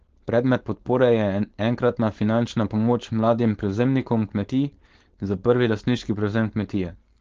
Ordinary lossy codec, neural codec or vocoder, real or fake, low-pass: Opus, 16 kbps; codec, 16 kHz, 4.8 kbps, FACodec; fake; 7.2 kHz